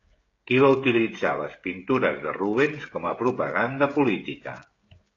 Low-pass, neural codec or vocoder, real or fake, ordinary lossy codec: 7.2 kHz; codec, 16 kHz, 16 kbps, FreqCodec, smaller model; fake; AAC, 32 kbps